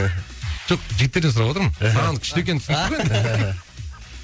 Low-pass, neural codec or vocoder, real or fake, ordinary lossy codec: none; none; real; none